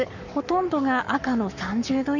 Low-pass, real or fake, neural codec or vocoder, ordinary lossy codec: 7.2 kHz; fake; codec, 44.1 kHz, 7.8 kbps, Pupu-Codec; none